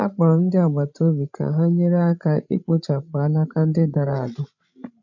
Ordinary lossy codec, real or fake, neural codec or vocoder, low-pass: none; fake; codec, 16 kHz, 16 kbps, FreqCodec, larger model; 7.2 kHz